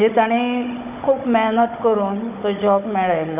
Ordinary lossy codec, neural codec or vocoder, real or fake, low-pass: none; codec, 16 kHz, 16 kbps, FunCodec, trained on Chinese and English, 50 frames a second; fake; 3.6 kHz